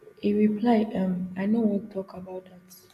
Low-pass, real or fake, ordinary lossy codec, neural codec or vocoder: 14.4 kHz; real; none; none